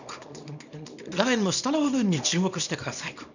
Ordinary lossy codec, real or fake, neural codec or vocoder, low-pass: none; fake; codec, 24 kHz, 0.9 kbps, WavTokenizer, small release; 7.2 kHz